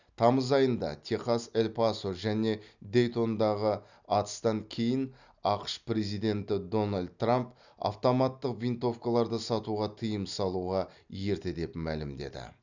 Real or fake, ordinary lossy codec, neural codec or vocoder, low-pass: real; none; none; 7.2 kHz